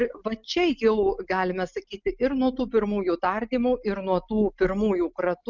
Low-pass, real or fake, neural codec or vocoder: 7.2 kHz; real; none